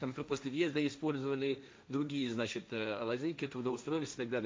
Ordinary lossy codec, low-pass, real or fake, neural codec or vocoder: none; none; fake; codec, 16 kHz, 1.1 kbps, Voila-Tokenizer